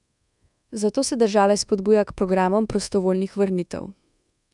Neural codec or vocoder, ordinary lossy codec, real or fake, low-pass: codec, 24 kHz, 1.2 kbps, DualCodec; none; fake; 10.8 kHz